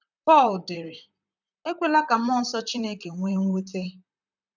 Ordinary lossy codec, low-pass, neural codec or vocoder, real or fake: none; 7.2 kHz; vocoder, 44.1 kHz, 128 mel bands, Pupu-Vocoder; fake